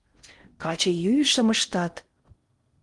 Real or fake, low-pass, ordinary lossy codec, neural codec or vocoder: fake; 10.8 kHz; Opus, 24 kbps; codec, 16 kHz in and 24 kHz out, 0.6 kbps, FocalCodec, streaming, 4096 codes